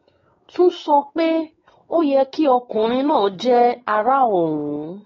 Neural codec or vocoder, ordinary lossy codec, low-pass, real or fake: codec, 16 kHz, 4 kbps, X-Codec, WavLM features, trained on Multilingual LibriSpeech; AAC, 24 kbps; 7.2 kHz; fake